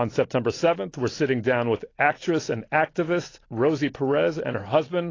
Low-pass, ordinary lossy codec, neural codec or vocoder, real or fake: 7.2 kHz; AAC, 32 kbps; none; real